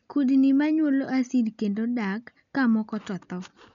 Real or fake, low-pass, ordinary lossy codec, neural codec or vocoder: real; 7.2 kHz; none; none